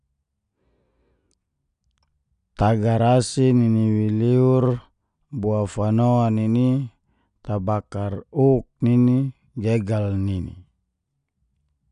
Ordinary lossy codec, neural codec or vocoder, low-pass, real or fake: none; none; 9.9 kHz; real